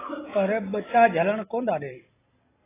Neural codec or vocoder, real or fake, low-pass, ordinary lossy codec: none; real; 3.6 kHz; AAC, 16 kbps